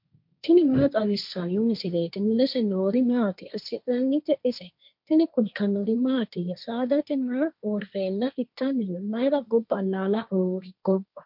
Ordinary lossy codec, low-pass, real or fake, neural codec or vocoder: MP3, 48 kbps; 5.4 kHz; fake; codec, 16 kHz, 1.1 kbps, Voila-Tokenizer